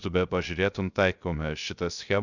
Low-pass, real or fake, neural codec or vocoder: 7.2 kHz; fake; codec, 16 kHz, 0.3 kbps, FocalCodec